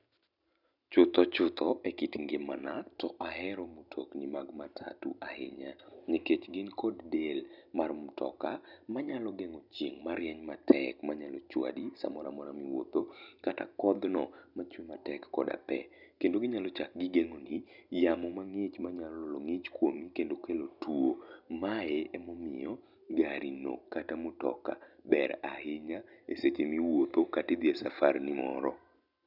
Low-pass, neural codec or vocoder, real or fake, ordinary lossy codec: 5.4 kHz; none; real; none